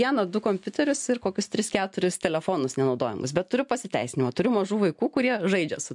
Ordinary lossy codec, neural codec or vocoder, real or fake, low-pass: MP3, 64 kbps; none; real; 10.8 kHz